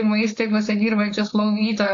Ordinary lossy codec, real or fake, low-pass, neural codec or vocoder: AAC, 48 kbps; fake; 7.2 kHz; codec, 16 kHz, 4.8 kbps, FACodec